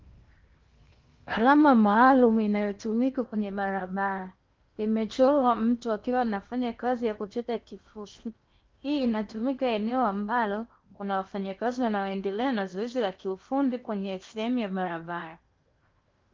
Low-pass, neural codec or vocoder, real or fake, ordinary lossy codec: 7.2 kHz; codec, 16 kHz in and 24 kHz out, 0.8 kbps, FocalCodec, streaming, 65536 codes; fake; Opus, 32 kbps